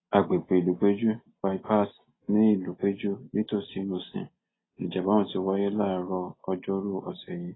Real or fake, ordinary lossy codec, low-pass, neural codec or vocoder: real; AAC, 16 kbps; 7.2 kHz; none